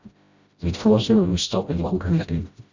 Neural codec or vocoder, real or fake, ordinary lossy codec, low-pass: codec, 16 kHz, 0.5 kbps, FreqCodec, smaller model; fake; Opus, 64 kbps; 7.2 kHz